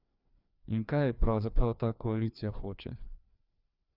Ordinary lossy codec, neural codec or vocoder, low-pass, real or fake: Opus, 64 kbps; codec, 16 kHz, 2 kbps, FreqCodec, larger model; 5.4 kHz; fake